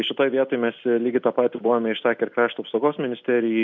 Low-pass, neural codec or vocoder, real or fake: 7.2 kHz; none; real